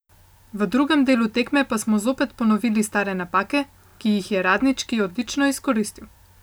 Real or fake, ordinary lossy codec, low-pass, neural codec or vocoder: real; none; none; none